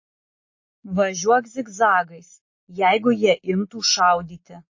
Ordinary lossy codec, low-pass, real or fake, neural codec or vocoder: MP3, 32 kbps; 7.2 kHz; real; none